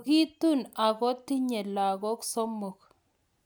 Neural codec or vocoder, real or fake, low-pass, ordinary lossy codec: none; real; none; none